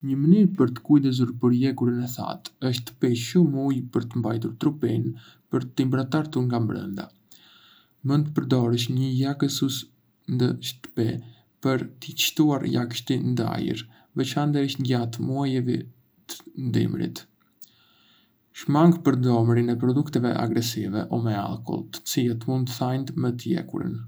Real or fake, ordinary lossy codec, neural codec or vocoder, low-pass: real; none; none; none